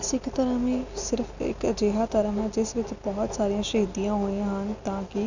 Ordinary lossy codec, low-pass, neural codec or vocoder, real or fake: none; 7.2 kHz; none; real